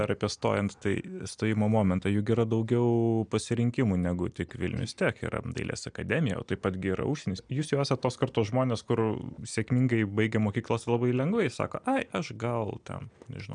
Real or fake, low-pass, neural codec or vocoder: real; 9.9 kHz; none